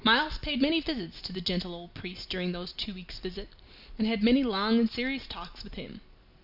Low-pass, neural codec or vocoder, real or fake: 5.4 kHz; none; real